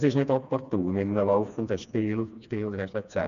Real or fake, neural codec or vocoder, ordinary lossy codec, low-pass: fake; codec, 16 kHz, 2 kbps, FreqCodec, smaller model; none; 7.2 kHz